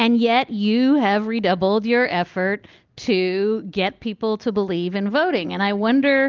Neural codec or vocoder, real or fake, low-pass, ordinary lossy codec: none; real; 7.2 kHz; Opus, 32 kbps